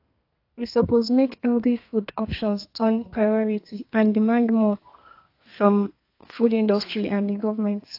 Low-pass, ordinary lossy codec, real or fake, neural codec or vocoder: 5.4 kHz; AAC, 32 kbps; fake; codec, 32 kHz, 1.9 kbps, SNAC